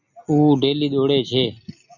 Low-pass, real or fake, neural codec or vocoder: 7.2 kHz; real; none